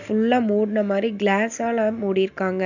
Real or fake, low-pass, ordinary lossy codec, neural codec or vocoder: real; 7.2 kHz; none; none